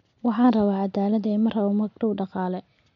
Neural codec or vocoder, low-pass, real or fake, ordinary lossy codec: none; 7.2 kHz; real; MP3, 48 kbps